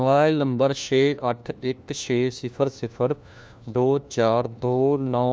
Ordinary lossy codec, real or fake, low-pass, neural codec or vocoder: none; fake; none; codec, 16 kHz, 1 kbps, FunCodec, trained on LibriTTS, 50 frames a second